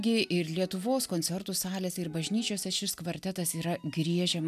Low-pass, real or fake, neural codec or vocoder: 14.4 kHz; real; none